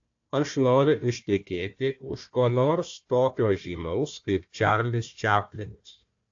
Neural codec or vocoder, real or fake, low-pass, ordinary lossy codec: codec, 16 kHz, 1 kbps, FunCodec, trained on Chinese and English, 50 frames a second; fake; 7.2 kHz; AAC, 48 kbps